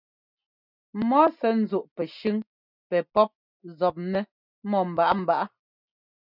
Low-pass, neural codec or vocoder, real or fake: 5.4 kHz; none; real